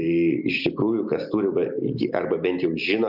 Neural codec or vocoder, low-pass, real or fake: none; 5.4 kHz; real